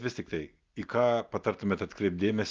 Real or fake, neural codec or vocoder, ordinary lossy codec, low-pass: real; none; Opus, 32 kbps; 7.2 kHz